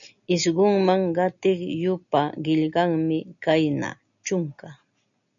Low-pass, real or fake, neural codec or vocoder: 7.2 kHz; real; none